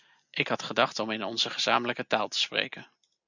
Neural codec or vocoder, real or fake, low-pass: none; real; 7.2 kHz